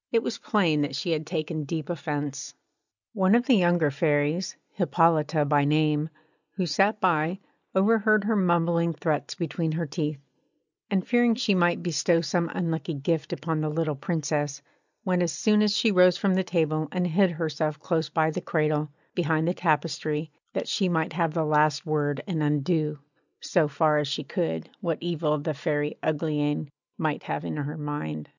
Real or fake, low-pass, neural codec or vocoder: real; 7.2 kHz; none